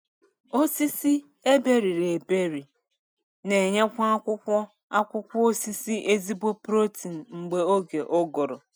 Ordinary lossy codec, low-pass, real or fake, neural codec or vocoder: none; none; real; none